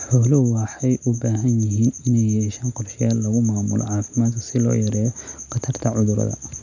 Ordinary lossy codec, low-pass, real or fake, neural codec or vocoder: none; 7.2 kHz; real; none